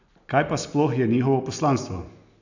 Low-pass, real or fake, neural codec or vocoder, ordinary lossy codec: 7.2 kHz; real; none; none